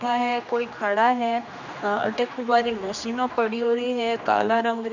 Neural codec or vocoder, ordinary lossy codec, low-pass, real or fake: codec, 16 kHz, 2 kbps, X-Codec, HuBERT features, trained on general audio; none; 7.2 kHz; fake